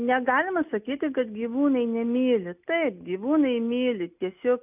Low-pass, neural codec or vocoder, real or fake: 3.6 kHz; none; real